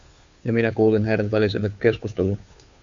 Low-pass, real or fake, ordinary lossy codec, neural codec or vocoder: 7.2 kHz; fake; Opus, 64 kbps; codec, 16 kHz, 2 kbps, FunCodec, trained on Chinese and English, 25 frames a second